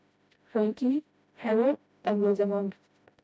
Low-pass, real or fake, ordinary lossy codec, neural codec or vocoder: none; fake; none; codec, 16 kHz, 0.5 kbps, FreqCodec, smaller model